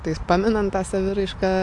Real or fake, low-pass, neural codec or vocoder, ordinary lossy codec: real; 10.8 kHz; none; AAC, 64 kbps